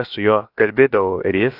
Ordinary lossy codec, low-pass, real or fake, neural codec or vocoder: AAC, 32 kbps; 5.4 kHz; fake; codec, 16 kHz, about 1 kbps, DyCAST, with the encoder's durations